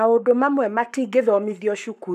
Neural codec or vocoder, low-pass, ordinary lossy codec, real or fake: codec, 44.1 kHz, 7.8 kbps, Pupu-Codec; 14.4 kHz; none; fake